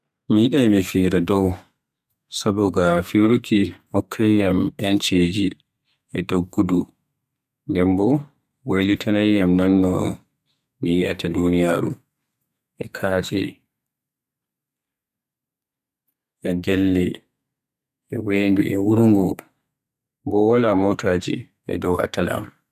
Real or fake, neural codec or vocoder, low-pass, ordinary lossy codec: fake; codec, 32 kHz, 1.9 kbps, SNAC; 14.4 kHz; none